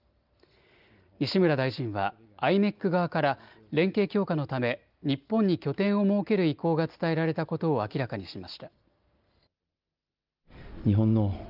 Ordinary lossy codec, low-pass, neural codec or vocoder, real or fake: Opus, 32 kbps; 5.4 kHz; none; real